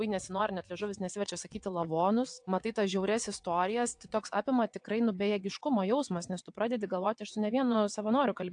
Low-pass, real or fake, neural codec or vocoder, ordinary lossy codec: 9.9 kHz; fake; vocoder, 22.05 kHz, 80 mel bands, WaveNeXt; AAC, 64 kbps